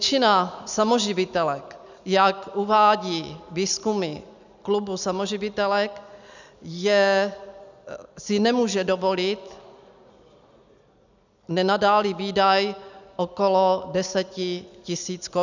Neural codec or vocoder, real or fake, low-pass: none; real; 7.2 kHz